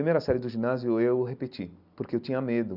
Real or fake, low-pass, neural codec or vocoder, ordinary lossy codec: real; 5.4 kHz; none; none